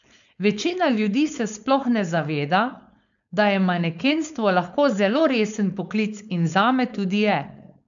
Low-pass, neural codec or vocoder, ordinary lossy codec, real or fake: 7.2 kHz; codec, 16 kHz, 4.8 kbps, FACodec; none; fake